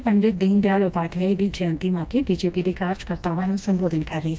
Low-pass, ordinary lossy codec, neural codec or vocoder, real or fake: none; none; codec, 16 kHz, 1 kbps, FreqCodec, smaller model; fake